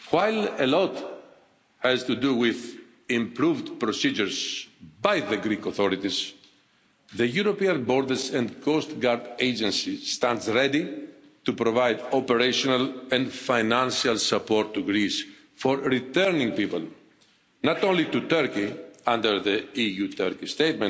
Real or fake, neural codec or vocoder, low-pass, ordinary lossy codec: real; none; none; none